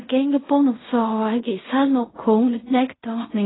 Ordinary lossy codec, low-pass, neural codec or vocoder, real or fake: AAC, 16 kbps; 7.2 kHz; codec, 16 kHz in and 24 kHz out, 0.4 kbps, LongCat-Audio-Codec, fine tuned four codebook decoder; fake